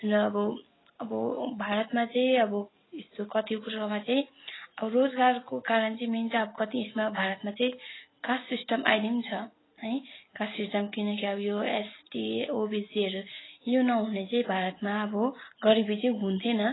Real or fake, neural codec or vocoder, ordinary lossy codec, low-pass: real; none; AAC, 16 kbps; 7.2 kHz